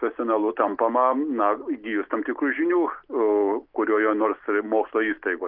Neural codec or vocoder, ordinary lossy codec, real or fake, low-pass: none; Opus, 32 kbps; real; 5.4 kHz